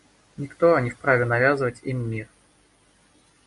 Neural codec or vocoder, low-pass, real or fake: none; 10.8 kHz; real